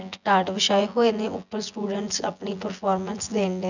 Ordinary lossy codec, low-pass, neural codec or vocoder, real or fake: none; 7.2 kHz; vocoder, 24 kHz, 100 mel bands, Vocos; fake